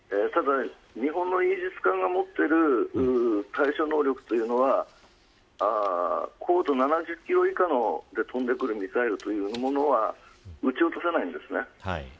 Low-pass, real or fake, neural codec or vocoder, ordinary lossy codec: none; real; none; none